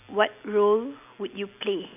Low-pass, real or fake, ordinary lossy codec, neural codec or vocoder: 3.6 kHz; real; none; none